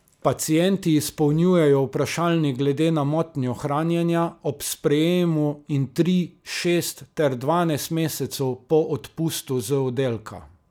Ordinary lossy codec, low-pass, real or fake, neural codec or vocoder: none; none; real; none